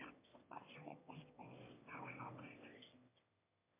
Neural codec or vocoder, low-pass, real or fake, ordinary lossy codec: autoencoder, 22.05 kHz, a latent of 192 numbers a frame, VITS, trained on one speaker; 3.6 kHz; fake; MP3, 32 kbps